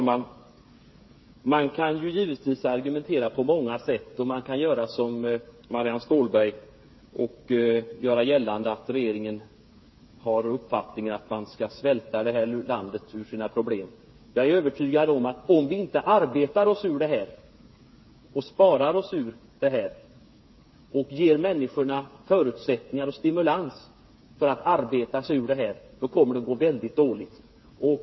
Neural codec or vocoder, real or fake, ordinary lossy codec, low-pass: codec, 16 kHz, 8 kbps, FreqCodec, smaller model; fake; MP3, 24 kbps; 7.2 kHz